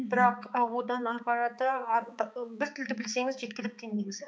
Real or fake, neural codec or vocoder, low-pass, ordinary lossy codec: fake; codec, 16 kHz, 4 kbps, X-Codec, HuBERT features, trained on balanced general audio; none; none